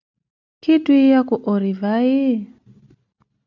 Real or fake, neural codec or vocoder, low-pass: real; none; 7.2 kHz